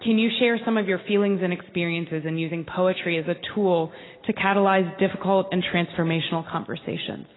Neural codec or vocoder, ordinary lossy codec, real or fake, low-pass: none; AAC, 16 kbps; real; 7.2 kHz